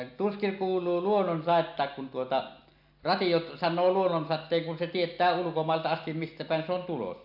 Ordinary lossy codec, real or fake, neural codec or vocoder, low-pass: AAC, 48 kbps; real; none; 5.4 kHz